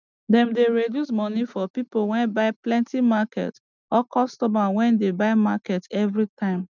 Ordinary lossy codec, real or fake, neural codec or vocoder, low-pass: none; real; none; 7.2 kHz